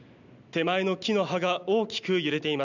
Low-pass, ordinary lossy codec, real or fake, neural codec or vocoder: 7.2 kHz; none; real; none